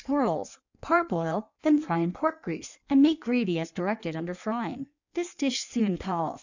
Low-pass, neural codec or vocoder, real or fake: 7.2 kHz; codec, 16 kHz in and 24 kHz out, 1.1 kbps, FireRedTTS-2 codec; fake